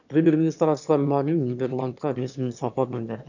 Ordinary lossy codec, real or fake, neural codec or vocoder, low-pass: none; fake; autoencoder, 22.05 kHz, a latent of 192 numbers a frame, VITS, trained on one speaker; 7.2 kHz